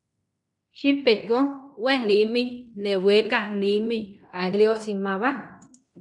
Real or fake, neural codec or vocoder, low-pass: fake; codec, 16 kHz in and 24 kHz out, 0.9 kbps, LongCat-Audio-Codec, fine tuned four codebook decoder; 10.8 kHz